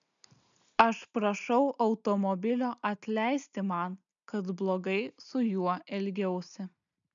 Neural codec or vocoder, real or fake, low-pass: none; real; 7.2 kHz